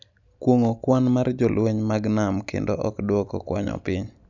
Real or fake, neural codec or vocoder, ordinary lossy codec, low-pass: real; none; none; 7.2 kHz